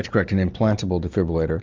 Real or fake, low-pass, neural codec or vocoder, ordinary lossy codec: real; 7.2 kHz; none; MP3, 64 kbps